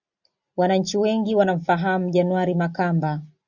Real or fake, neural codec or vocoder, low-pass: real; none; 7.2 kHz